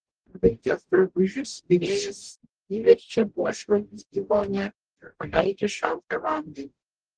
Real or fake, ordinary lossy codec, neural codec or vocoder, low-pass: fake; Opus, 16 kbps; codec, 44.1 kHz, 0.9 kbps, DAC; 9.9 kHz